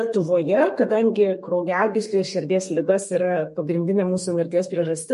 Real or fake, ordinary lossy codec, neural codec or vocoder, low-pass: fake; MP3, 48 kbps; codec, 32 kHz, 1.9 kbps, SNAC; 14.4 kHz